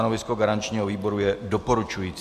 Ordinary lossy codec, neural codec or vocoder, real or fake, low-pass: MP3, 96 kbps; none; real; 14.4 kHz